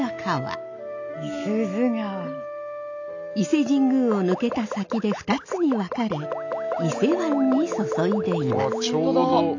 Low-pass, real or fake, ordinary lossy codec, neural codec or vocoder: 7.2 kHz; real; AAC, 48 kbps; none